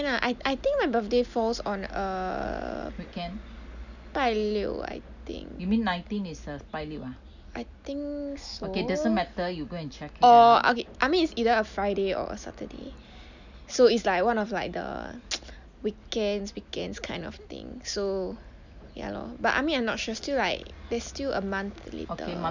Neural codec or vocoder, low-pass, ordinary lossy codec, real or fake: none; 7.2 kHz; none; real